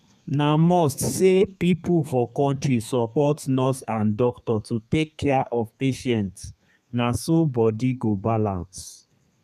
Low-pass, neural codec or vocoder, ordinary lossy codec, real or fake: 14.4 kHz; codec, 32 kHz, 1.9 kbps, SNAC; none; fake